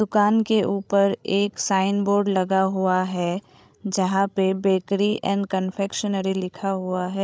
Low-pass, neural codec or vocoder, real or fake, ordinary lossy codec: none; codec, 16 kHz, 16 kbps, FreqCodec, larger model; fake; none